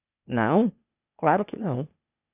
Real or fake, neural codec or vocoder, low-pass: fake; codec, 16 kHz, 0.8 kbps, ZipCodec; 3.6 kHz